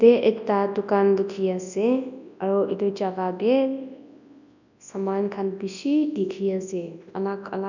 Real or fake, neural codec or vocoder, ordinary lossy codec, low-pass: fake; codec, 24 kHz, 0.9 kbps, WavTokenizer, large speech release; none; 7.2 kHz